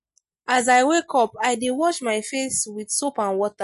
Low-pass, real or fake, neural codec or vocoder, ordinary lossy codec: 14.4 kHz; real; none; MP3, 48 kbps